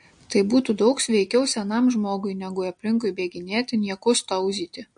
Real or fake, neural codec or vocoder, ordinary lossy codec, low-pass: real; none; MP3, 48 kbps; 9.9 kHz